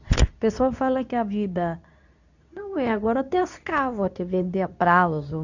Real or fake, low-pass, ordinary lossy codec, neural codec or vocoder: fake; 7.2 kHz; none; codec, 24 kHz, 0.9 kbps, WavTokenizer, medium speech release version 2